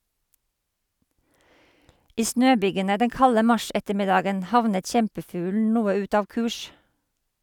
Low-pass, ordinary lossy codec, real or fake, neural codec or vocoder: 19.8 kHz; none; real; none